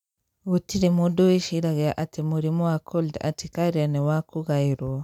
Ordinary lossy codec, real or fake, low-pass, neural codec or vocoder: none; real; 19.8 kHz; none